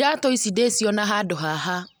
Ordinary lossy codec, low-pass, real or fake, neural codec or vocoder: none; none; real; none